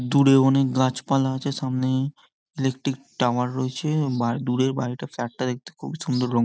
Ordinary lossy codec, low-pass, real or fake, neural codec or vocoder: none; none; real; none